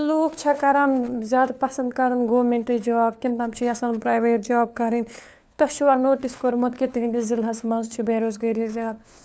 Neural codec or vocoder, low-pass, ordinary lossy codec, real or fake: codec, 16 kHz, 4 kbps, FunCodec, trained on LibriTTS, 50 frames a second; none; none; fake